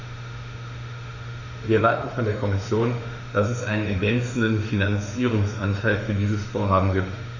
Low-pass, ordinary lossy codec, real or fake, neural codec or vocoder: 7.2 kHz; none; fake; autoencoder, 48 kHz, 32 numbers a frame, DAC-VAE, trained on Japanese speech